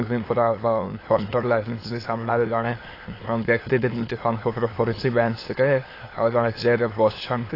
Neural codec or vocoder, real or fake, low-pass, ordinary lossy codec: autoencoder, 22.05 kHz, a latent of 192 numbers a frame, VITS, trained on many speakers; fake; 5.4 kHz; AAC, 24 kbps